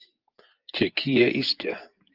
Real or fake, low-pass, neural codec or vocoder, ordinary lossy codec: fake; 5.4 kHz; vocoder, 24 kHz, 100 mel bands, Vocos; Opus, 32 kbps